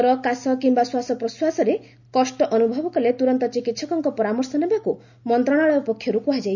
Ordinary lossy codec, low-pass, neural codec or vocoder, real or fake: none; 7.2 kHz; none; real